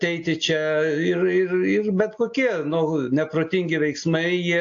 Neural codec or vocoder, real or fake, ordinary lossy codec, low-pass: none; real; AAC, 48 kbps; 7.2 kHz